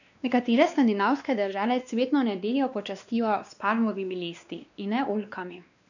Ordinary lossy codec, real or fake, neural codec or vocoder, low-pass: none; fake; codec, 16 kHz, 2 kbps, X-Codec, WavLM features, trained on Multilingual LibriSpeech; 7.2 kHz